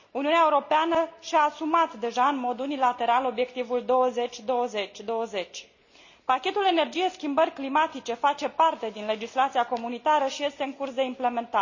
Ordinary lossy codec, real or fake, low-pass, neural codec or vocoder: none; real; 7.2 kHz; none